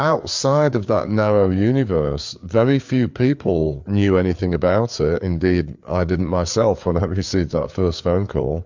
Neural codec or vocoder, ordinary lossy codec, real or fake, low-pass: codec, 16 kHz, 4 kbps, FreqCodec, larger model; MP3, 64 kbps; fake; 7.2 kHz